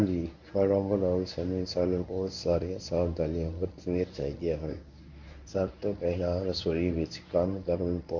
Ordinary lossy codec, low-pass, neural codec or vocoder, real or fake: none; 7.2 kHz; codec, 24 kHz, 0.9 kbps, WavTokenizer, medium speech release version 1; fake